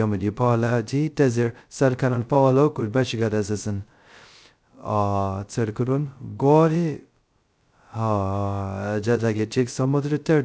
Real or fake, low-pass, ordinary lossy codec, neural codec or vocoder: fake; none; none; codec, 16 kHz, 0.2 kbps, FocalCodec